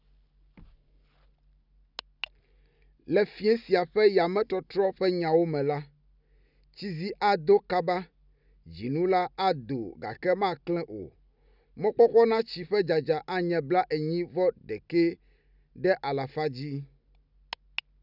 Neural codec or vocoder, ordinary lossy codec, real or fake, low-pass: none; none; real; 5.4 kHz